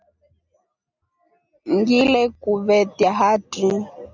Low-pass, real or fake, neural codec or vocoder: 7.2 kHz; real; none